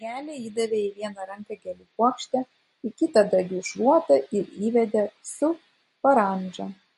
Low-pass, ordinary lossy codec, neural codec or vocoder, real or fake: 14.4 kHz; MP3, 48 kbps; none; real